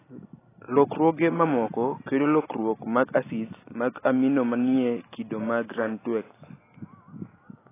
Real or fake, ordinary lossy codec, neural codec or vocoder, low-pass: real; AAC, 16 kbps; none; 3.6 kHz